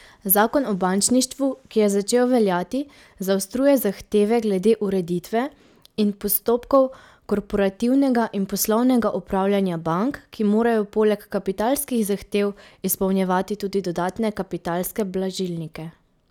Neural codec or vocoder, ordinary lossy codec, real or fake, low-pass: none; none; real; 19.8 kHz